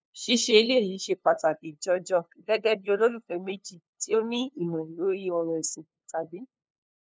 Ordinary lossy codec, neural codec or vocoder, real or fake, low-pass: none; codec, 16 kHz, 2 kbps, FunCodec, trained on LibriTTS, 25 frames a second; fake; none